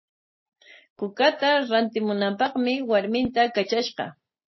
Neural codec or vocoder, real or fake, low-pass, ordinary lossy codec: none; real; 7.2 kHz; MP3, 24 kbps